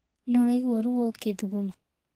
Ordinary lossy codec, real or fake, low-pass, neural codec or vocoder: Opus, 24 kbps; fake; 14.4 kHz; codec, 32 kHz, 1.9 kbps, SNAC